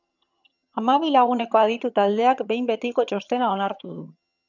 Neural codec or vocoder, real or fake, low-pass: vocoder, 22.05 kHz, 80 mel bands, HiFi-GAN; fake; 7.2 kHz